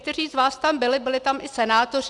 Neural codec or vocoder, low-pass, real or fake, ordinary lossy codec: none; 10.8 kHz; real; Opus, 64 kbps